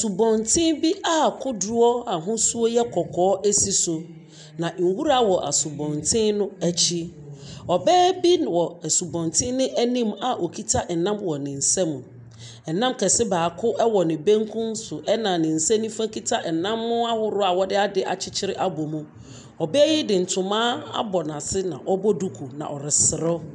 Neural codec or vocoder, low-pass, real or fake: none; 10.8 kHz; real